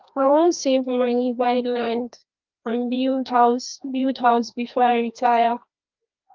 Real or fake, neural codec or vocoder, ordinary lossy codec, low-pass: fake; codec, 16 kHz, 1 kbps, FreqCodec, larger model; Opus, 24 kbps; 7.2 kHz